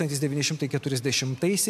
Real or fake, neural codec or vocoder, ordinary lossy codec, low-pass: real; none; MP3, 96 kbps; 14.4 kHz